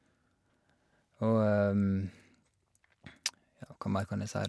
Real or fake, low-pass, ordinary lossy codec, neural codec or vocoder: real; none; none; none